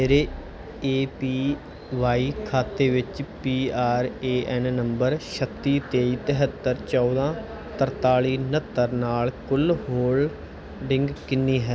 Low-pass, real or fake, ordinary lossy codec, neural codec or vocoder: none; real; none; none